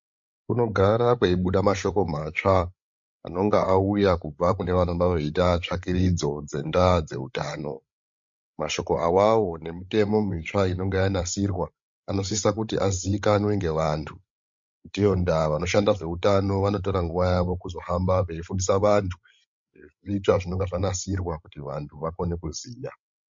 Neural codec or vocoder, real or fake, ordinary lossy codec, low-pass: codec, 16 kHz, 16 kbps, FreqCodec, larger model; fake; MP3, 48 kbps; 7.2 kHz